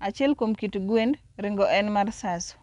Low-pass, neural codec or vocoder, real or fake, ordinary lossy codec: 10.8 kHz; none; real; none